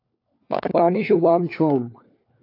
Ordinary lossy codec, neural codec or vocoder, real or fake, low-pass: AAC, 32 kbps; codec, 16 kHz, 4 kbps, FunCodec, trained on LibriTTS, 50 frames a second; fake; 5.4 kHz